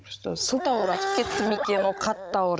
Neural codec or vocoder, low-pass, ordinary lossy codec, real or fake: codec, 16 kHz, 16 kbps, FunCodec, trained on Chinese and English, 50 frames a second; none; none; fake